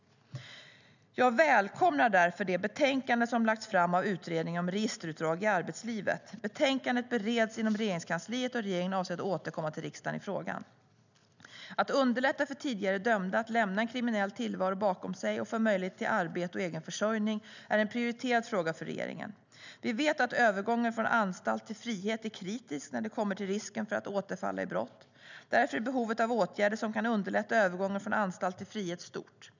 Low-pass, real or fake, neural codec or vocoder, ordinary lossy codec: 7.2 kHz; real; none; none